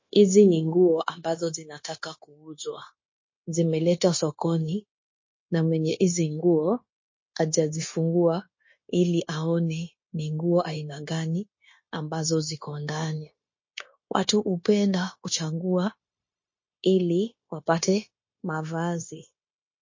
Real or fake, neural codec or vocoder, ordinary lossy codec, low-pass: fake; codec, 16 kHz, 0.9 kbps, LongCat-Audio-Codec; MP3, 32 kbps; 7.2 kHz